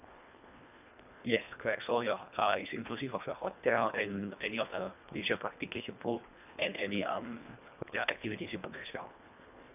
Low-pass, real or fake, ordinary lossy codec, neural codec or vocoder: 3.6 kHz; fake; none; codec, 24 kHz, 1.5 kbps, HILCodec